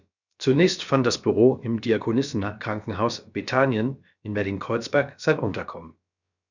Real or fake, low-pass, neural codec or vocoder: fake; 7.2 kHz; codec, 16 kHz, about 1 kbps, DyCAST, with the encoder's durations